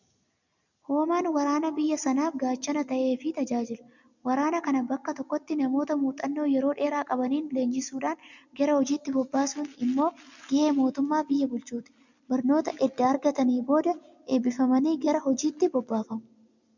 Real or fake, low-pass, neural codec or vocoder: real; 7.2 kHz; none